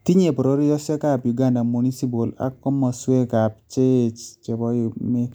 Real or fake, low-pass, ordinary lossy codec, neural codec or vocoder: real; none; none; none